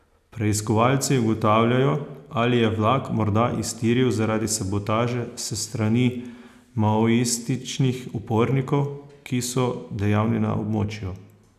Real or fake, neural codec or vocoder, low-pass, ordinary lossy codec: real; none; 14.4 kHz; none